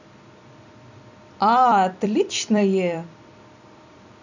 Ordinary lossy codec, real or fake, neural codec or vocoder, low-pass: none; fake; vocoder, 44.1 kHz, 128 mel bands every 256 samples, BigVGAN v2; 7.2 kHz